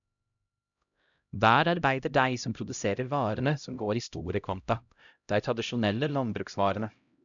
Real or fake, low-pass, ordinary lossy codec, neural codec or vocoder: fake; 7.2 kHz; none; codec, 16 kHz, 0.5 kbps, X-Codec, HuBERT features, trained on LibriSpeech